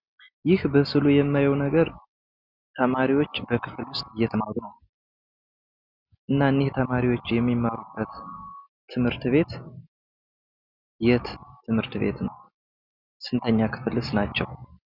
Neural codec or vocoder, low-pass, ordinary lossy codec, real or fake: none; 5.4 kHz; Opus, 64 kbps; real